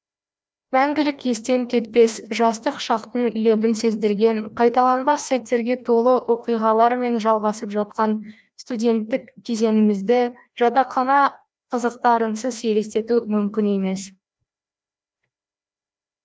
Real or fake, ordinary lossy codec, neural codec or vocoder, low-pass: fake; none; codec, 16 kHz, 1 kbps, FreqCodec, larger model; none